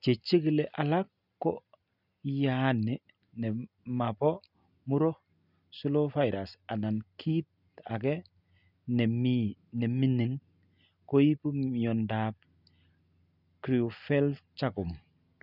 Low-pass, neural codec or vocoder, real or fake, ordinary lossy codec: 5.4 kHz; none; real; none